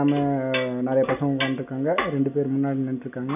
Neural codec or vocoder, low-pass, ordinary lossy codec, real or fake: none; 3.6 kHz; none; real